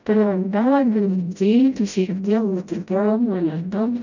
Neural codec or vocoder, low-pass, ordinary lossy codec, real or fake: codec, 16 kHz, 0.5 kbps, FreqCodec, smaller model; 7.2 kHz; none; fake